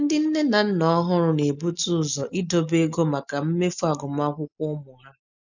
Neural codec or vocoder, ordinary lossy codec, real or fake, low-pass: none; none; real; 7.2 kHz